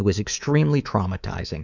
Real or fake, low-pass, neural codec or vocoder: fake; 7.2 kHz; codec, 24 kHz, 3.1 kbps, DualCodec